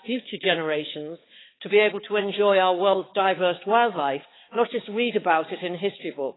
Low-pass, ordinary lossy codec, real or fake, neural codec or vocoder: 7.2 kHz; AAC, 16 kbps; fake; codec, 16 kHz, 4 kbps, X-Codec, HuBERT features, trained on balanced general audio